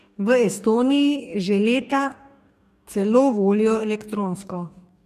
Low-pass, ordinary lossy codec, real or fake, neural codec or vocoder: 14.4 kHz; none; fake; codec, 44.1 kHz, 2.6 kbps, DAC